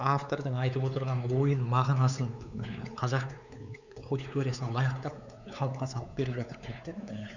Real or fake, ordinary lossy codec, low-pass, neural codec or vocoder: fake; none; 7.2 kHz; codec, 16 kHz, 4 kbps, X-Codec, WavLM features, trained on Multilingual LibriSpeech